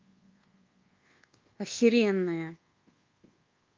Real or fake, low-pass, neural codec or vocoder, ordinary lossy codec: fake; 7.2 kHz; codec, 24 kHz, 1.2 kbps, DualCodec; Opus, 32 kbps